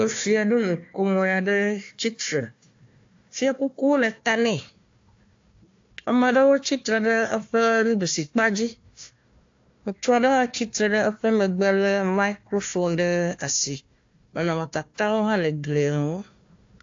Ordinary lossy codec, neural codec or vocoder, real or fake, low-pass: AAC, 48 kbps; codec, 16 kHz, 1 kbps, FunCodec, trained on Chinese and English, 50 frames a second; fake; 7.2 kHz